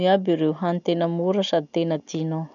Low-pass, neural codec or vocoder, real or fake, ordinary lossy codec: 7.2 kHz; none; real; none